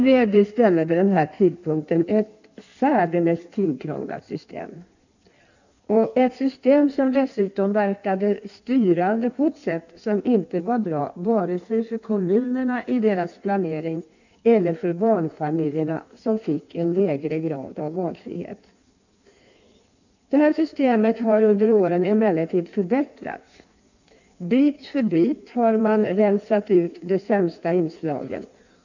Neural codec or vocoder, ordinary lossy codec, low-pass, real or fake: codec, 16 kHz in and 24 kHz out, 1.1 kbps, FireRedTTS-2 codec; none; 7.2 kHz; fake